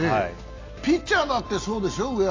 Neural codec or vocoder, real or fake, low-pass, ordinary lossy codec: none; real; 7.2 kHz; AAC, 32 kbps